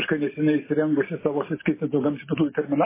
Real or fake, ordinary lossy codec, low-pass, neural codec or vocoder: real; MP3, 16 kbps; 3.6 kHz; none